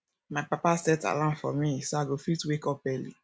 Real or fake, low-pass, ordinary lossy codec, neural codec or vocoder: real; none; none; none